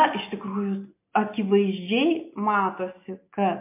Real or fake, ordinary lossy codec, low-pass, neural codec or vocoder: real; MP3, 24 kbps; 3.6 kHz; none